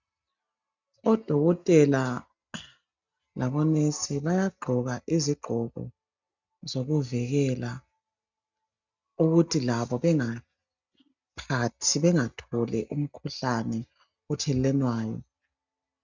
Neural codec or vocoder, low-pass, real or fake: none; 7.2 kHz; real